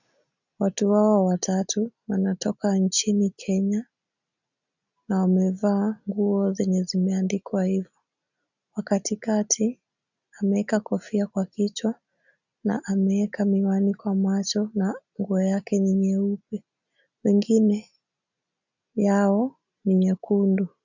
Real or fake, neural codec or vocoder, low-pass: real; none; 7.2 kHz